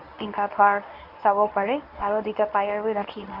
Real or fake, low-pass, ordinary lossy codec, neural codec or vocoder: fake; 5.4 kHz; none; codec, 24 kHz, 0.9 kbps, WavTokenizer, medium speech release version 1